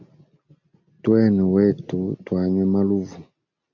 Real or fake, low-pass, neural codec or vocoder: real; 7.2 kHz; none